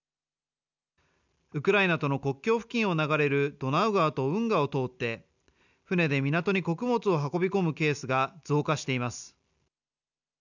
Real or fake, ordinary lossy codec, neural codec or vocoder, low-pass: real; none; none; 7.2 kHz